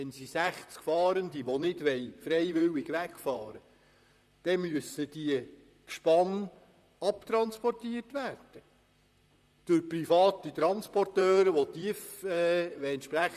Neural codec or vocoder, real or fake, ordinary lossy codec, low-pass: vocoder, 44.1 kHz, 128 mel bands, Pupu-Vocoder; fake; none; 14.4 kHz